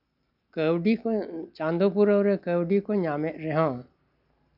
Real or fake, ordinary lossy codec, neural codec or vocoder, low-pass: real; none; none; 5.4 kHz